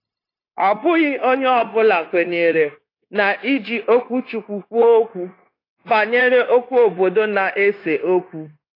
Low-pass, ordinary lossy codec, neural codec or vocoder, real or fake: 5.4 kHz; AAC, 32 kbps; codec, 16 kHz, 0.9 kbps, LongCat-Audio-Codec; fake